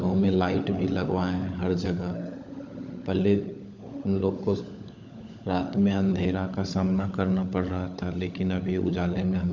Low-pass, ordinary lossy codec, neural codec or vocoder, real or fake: 7.2 kHz; none; codec, 16 kHz, 16 kbps, FunCodec, trained on LibriTTS, 50 frames a second; fake